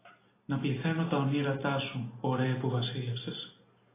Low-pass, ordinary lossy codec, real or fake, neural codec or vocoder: 3.6 kHz; AAC, 16 kbps; real; none